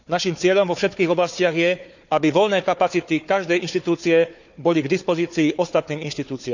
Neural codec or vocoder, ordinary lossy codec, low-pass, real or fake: codec, 16 kHz, 4 kbps, FunCodec, trained on Chinese and English, 50 frames a second; none; 7.2 kHz; fake